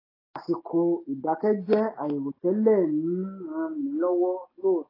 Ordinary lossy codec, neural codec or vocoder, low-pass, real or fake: AAC, 24 kbps; none; 5.4 kHz; real